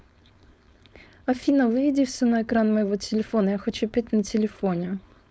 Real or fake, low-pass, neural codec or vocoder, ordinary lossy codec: fake; none; codec, 16 kHz, 4.8 kbps, FACodec; none